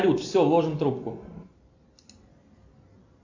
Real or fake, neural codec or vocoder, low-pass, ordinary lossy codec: real; none; 7.2 kHz; AAC, 48 kbps